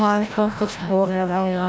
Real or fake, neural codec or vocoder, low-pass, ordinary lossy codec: fake; codec, 16 kHz, 0.5 kbps, FreqCodec, larger model; none; none